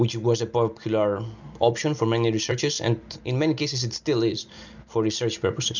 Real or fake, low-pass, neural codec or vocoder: real; 7.2 kHz; none